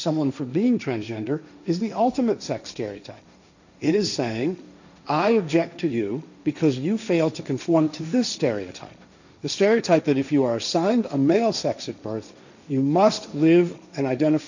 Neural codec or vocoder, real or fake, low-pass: codec, 16 kHz, 1.1 kbps, Voila-Tokenizer; fake; 7.2 kHz